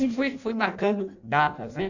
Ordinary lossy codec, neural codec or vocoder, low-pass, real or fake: none; codec, 16 kHz in and 24 kHz out, 0.6 kbps, FireRedTTS-2 codec; 7.2 kHz; fake